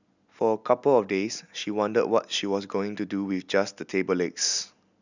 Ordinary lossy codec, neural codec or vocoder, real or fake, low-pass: none; none; real; 7.2 kHz